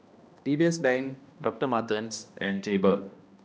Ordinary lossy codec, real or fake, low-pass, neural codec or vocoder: none; fake; none; codec, 16 kHz, 1 kbps, X-Codec, HuBERT features, trained on balanced general audio